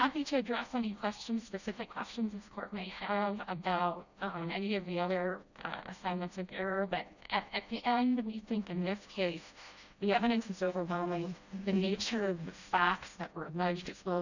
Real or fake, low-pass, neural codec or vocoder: fake; 7.2 kHz; codec, 16 kHz, 1 kbps, FreqCodec, smaller model